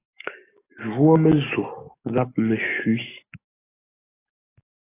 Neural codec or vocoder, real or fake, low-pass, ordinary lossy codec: codec, 44.1 kHz, 7.8 kbps, DAC; fake; 3.6 kHz; AAC, 16 kbps